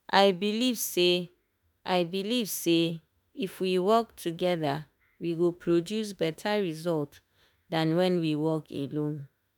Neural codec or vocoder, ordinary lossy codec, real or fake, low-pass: autoencoder, 48 kHz, 32 numbers a frame, DAC-VAE, trained on Japanese speech; none; fake; none